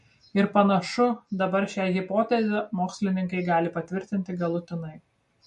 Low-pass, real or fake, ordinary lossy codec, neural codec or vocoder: 14.4 kHz; real; MP3, 48 kbps; none